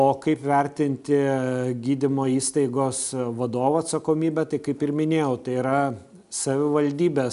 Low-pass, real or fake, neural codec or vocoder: 10.8 kHz; real; none